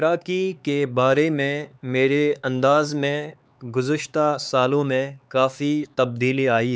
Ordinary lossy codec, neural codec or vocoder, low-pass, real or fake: none; codec, 16 kHz, 4 kbps, X-Codec, HuBERT features, trained on LibriSpeech; none; fake